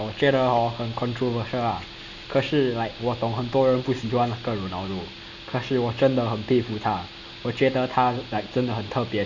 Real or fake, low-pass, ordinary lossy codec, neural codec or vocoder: real; 7.2 kHz; none; none